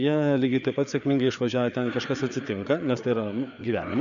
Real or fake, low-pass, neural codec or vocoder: fake; 7.2 kHz; codec, 16 kHz, 4 kbps, FreqCodec, larger model